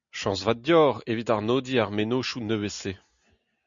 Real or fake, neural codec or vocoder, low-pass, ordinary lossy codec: real; none; 7.2 kHz; Opus, 64 kbps